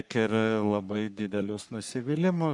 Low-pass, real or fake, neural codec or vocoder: 10.8 kHz; fake; codec, 44.1 kHz, 3.4 kbps, Pupu-Codec